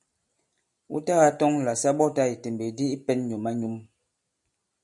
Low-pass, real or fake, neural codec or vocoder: 10.8 kHz; real; none